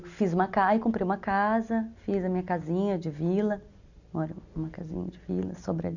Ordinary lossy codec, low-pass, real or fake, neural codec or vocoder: none; 7.2 kHz; real; none